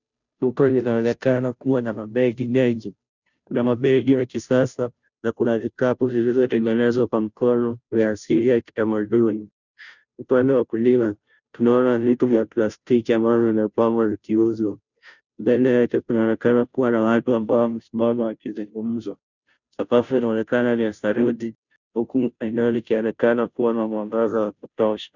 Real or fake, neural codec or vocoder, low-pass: fake; codec, 16 kHz, 0.5 kbps, FunCodec, trained on Chinese and English, 25 frames a second; 7.2 kHz